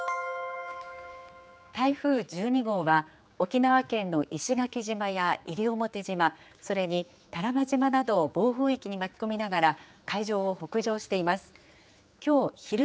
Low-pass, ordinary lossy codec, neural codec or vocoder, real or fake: none; none; codec, 16 kHz, 4 kbps, X-Codec, HuBERT features, trained on general audio; fake